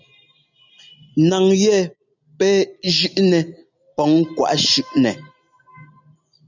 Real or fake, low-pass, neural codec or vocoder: real; 7.2 kHz; none